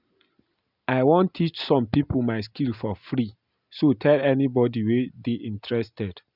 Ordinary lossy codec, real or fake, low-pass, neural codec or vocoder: none; real; 5.4 kHz; none